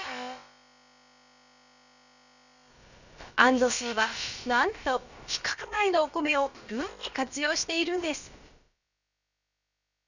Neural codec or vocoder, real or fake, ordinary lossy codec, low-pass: codec, 16 kHz, about 1 kbps, DyCAST, with the encoder's durations; fake; none; 7.2 kHz